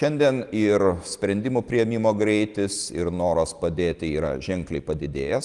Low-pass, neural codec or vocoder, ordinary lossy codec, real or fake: 10.8 kHz; none; Opus, 24 kbps; real